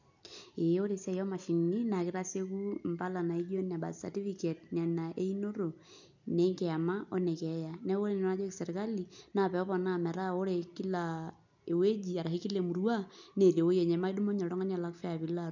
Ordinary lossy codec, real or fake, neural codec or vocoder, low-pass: none; real; none; 7.2 kHz